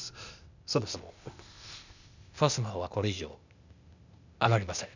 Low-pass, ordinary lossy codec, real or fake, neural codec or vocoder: 7.2 kHz; none; fake; codec, 16 kHz, 0.8 kbps, ZipCodec